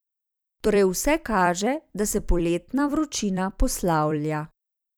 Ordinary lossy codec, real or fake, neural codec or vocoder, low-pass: none; fake; vocoder, 44.1 kHz, 128 mel bands every 256 samples, BigVGAN v2; none